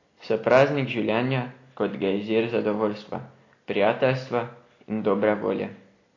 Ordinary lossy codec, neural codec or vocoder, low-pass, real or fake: AAC, 32 kbps; none; 7.2 kHz; real